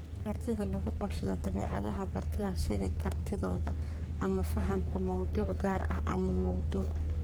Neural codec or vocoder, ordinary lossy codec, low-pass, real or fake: codec, 44.1 kHz, 3.4 kbps, Pupu-Codec; none; none; fake